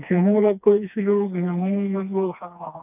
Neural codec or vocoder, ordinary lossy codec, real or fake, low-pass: codec, 16 kHz, 2 kbps, FreqCodec, smaller model; none; fake; 3.6 kHz